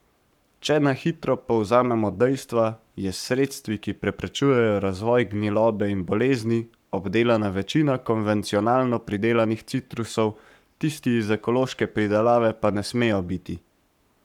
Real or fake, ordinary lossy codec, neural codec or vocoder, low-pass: fake; none; codec, 44.1 kHz, 7.8 kbps, Pupu-Codec; 19.8 kHz